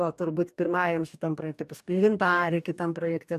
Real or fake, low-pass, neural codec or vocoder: fake; 14.4 kHz; codec, 44.1 kHz, 2.6 kbps, DAC